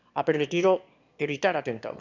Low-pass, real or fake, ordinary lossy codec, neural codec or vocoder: 7.2 kHz; fake; none; autoencoder, 22.05 kHz, a latent of 192 numbers a frame, VITS, trained on one speaker